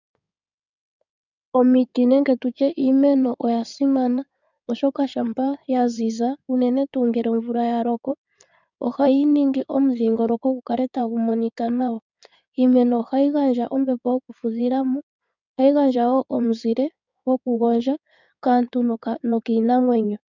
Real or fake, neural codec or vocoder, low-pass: fake; codec, 16 kHz in and 24 kHz out, 2.2 kbps, FireRedTTS-2 codec; 7.2 kHz